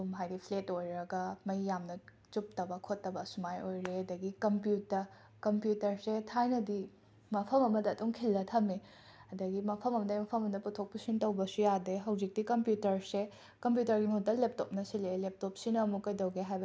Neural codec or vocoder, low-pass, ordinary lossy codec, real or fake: none; none; none; real